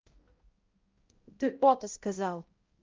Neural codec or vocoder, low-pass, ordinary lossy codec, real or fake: codec, 16 kHz, 0.5 kbps, X-Codec, HuBERT features, trained on balanced general audio; 7.2 kHz; Opus, 32 kbps; fake